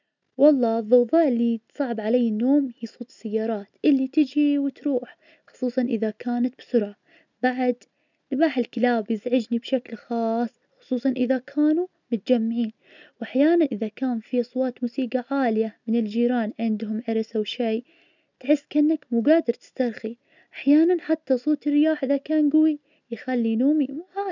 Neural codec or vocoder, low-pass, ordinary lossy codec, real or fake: none; 7.2 kHz; none; real